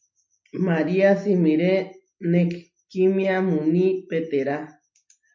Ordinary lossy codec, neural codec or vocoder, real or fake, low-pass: MP3, 48 kbps; none; real; 7.2 kHz